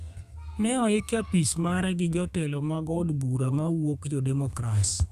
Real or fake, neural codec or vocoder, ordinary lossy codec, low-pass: fake; codec, 32 kHz, 1.9 kbps, SNAC; none; 14.4 kHz